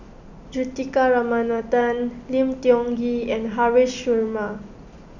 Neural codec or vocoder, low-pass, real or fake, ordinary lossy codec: none; 7.2 kHz; real; none